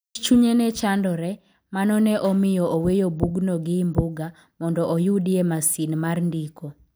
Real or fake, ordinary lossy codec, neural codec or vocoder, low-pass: real; none; none; none